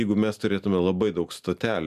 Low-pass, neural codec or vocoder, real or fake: 14.4 kHz; none; real